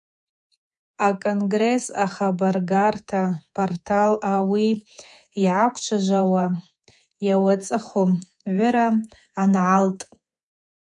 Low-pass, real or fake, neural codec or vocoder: 10.8 kHz; fake; codec, 24 kHz, 3.1 kbps, DualCodec